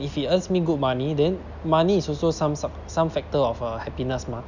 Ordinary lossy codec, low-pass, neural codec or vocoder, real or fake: none; 7.2 kHz; none; real